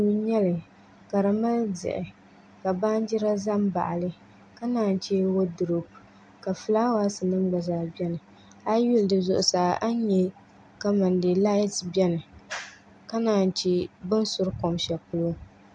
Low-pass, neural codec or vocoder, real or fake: 9.9 kHz; none; real